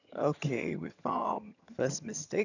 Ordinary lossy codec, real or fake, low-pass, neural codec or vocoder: none; fake; 7.2 kHz; vocoder, 22.05 kHz, 80 mel bands, HiFi-GAN